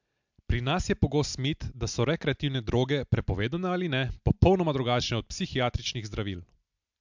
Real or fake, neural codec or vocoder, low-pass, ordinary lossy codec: real; none; 7.2 kHz; MP3, 64 kbps